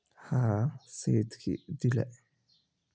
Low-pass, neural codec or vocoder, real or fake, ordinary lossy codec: none; none; real; none